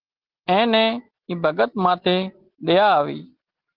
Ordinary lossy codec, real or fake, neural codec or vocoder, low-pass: Opus, 16 kbps; real; none; 5.4 kHz